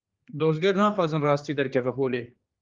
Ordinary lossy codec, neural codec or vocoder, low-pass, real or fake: Opus, 32 kbps; codec, 16 kHz, 2 kbps, X-Codec, HuBERT features, trained on general audio; 7.2 kHz; fake